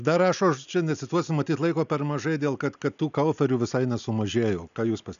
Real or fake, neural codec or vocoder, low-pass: real; none; 7.2 kHz